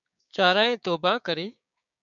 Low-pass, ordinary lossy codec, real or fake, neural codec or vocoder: 7.2 kHz; MP3, 96 kbps; fake; codec, 16 kHz, 6 kbps, DAC